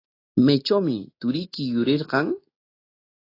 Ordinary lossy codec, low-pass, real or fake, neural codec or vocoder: AAC, 24 kbps; 5.4 kHz; real; none